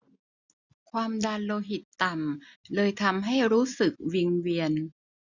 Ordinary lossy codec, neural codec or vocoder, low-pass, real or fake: none; none; 7.2 kHz; real